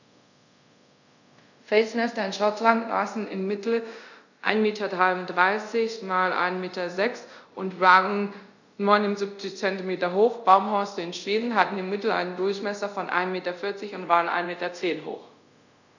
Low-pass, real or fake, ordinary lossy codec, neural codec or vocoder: 7.2 kHz; fake; none; codec, 24 kHz, 0.5 kbps, DualCodec